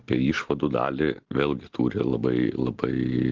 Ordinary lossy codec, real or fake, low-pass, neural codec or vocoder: Opus, 16 kbps; real; 7.2 kHz; none